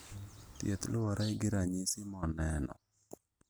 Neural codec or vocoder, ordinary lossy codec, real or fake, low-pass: none; none; real; none